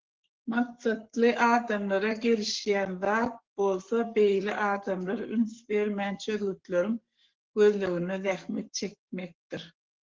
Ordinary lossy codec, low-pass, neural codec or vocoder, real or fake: Opus, 16 kbps; 7.2 kHz; codec, 44.1 kHz, 7.8 kbps, Pupu-Codec; fake